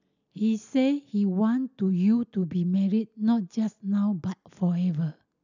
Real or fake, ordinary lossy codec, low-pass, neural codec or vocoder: real; none; 7.2 kHz; none